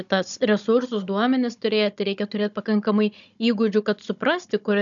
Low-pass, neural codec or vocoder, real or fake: 7.2 kHz; codec, 16 kHz, 16 kbps, FunCodec, trained on Chinese and English, 50 frames a second; fake